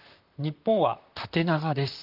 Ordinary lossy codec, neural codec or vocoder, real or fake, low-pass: Opus, 32 kbps; vocoder, 44.1 kHz, 128 mel bands, Pupu-Vocoder; fake; 5.4 kHz